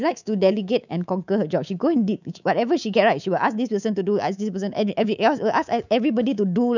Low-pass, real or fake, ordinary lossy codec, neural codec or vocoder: 7.2 kHz; fake; none; codec, 24 kHz, 3.1 kbps, DualCodec